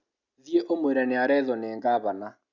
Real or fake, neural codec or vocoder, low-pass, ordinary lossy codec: real; none; 7.2 kHz; Opus, 64 kbps